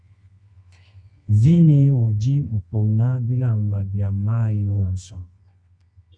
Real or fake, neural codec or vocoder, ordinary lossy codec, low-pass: fake; codec, 24 kHz, 0.9 kbps, WavTokenizer, medium music audio release; Opus, 64 kbps; 9.9 kHz